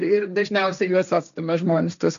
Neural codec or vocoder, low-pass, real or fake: codec, 16 kHz, 1.1 kbps, Voila-Tokenizer; 7.2 kHz; fake